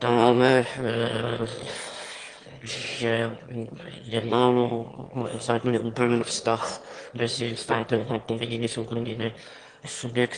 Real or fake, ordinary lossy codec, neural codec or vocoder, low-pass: fake; Opus, 24 kbps; autoencoder, 22.05 kHz, a latent of 192 numbers a frame, VITS, trained on one speaker; 9.9 kHz